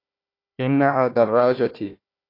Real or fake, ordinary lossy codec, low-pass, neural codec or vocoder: fake; AAC, 32 kbps; 5.4 kHz; codec, 16 kHz, 1 kbps, FunCodec, trained on Chinese and English, 50 frames a second